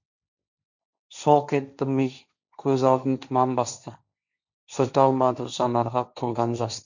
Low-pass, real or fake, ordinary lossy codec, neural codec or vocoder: 7.2 kHz; fake; none; codec, 16 kHz, 1.1 kbps, Voila-Tokenizer